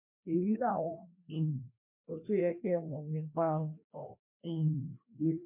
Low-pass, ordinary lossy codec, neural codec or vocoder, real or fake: 3.6 kHz; MP3, 32 kbps; codec, 16 kHz, 1 kbps, FreqCodec, larger model; fake